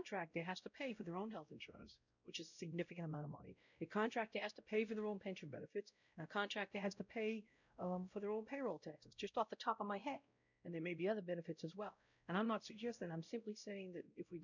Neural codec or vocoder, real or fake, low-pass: codec, 16 kHz, 0.5 kbps, X-Codec, WavLM features, trained on Multilingual LibriSpeech; fake; 7.2 kHz